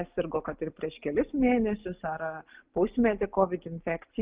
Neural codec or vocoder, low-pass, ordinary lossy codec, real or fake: none; 3.6 kHz; Opus, 32 kbps; real